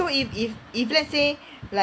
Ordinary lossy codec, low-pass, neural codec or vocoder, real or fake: none; none; none; real